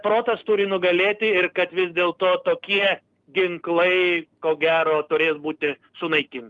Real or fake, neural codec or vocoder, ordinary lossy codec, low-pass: real; none; Opus, 32 kbps; 10.8 kHz